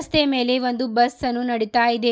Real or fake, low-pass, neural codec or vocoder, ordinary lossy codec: real; none; none; none